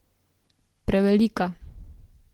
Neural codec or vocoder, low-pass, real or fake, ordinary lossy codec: none; 19.8 kHz; real; Opus, 16 kbps